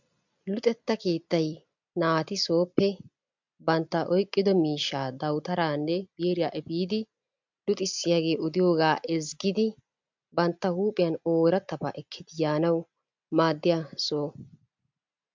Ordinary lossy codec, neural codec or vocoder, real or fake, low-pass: MP3, 64 kbps; none; real; 7.2 kHz